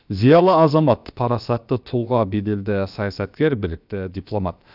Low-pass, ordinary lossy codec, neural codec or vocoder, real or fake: 5.4 kHz; none; codec, 16 kHz, about 1 kbps, DyCAST, with the encoder's durations; fake